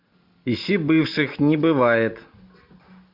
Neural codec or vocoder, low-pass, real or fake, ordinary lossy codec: none; 5.4 kHz; real; MP3, 48 kbps